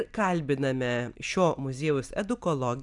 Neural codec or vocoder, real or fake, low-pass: vocoder, 44.1 kHz, 128 mel bands every 256 samples, BigVGAN v2; fake; 10.8 kHz